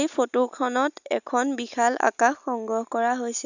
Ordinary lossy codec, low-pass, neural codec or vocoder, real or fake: none; 7.2 kHz; none; real